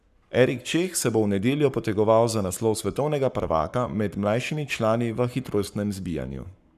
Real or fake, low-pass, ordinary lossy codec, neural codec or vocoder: fake; 14.4 kHz; none; codec, 44.1 kHz, 7.8 kbps, Pupu-Codec